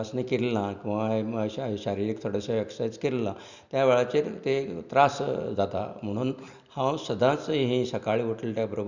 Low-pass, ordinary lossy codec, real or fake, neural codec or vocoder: 7.2 kHz; none; real; none